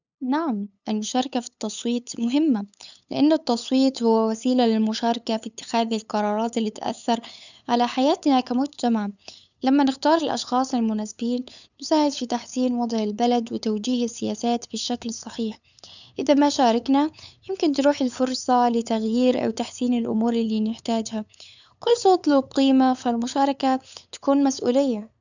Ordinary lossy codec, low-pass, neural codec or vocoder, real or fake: none; 7.2 kHz; codec, 16 kHz, 8 kbps, FunCodec, trained on LibriTTS, 25 frames a second; fake